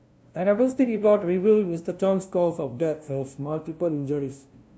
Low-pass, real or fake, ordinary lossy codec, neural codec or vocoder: none; fake; none; codec, 16 kHz, 0.5 kbps, FunCodec, trained on LibriTTS, 25 frames a second